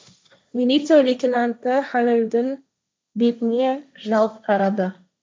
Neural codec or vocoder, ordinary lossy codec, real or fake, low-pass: codec, 16 kHz, 1.1 kbps, Voila-Tokenizer; none; fake; none